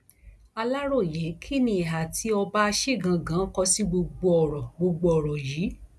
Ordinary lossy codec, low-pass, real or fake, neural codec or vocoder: none; none; real; none